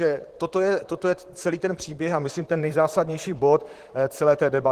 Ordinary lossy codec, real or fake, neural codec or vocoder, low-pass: Opus, 16 kbps; fake; vocoder, 44.1 kHz, 128 mel bands, Pupu-Vocoder; 14.4 kHz